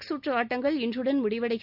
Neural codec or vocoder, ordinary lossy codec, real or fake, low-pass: none; none; real; 5.4 kHz